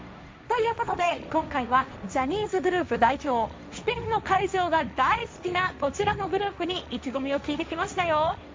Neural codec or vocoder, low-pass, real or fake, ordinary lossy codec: codec, 16 kHz, 1.1 kbps, Voila-Tokenizer; none; fake; none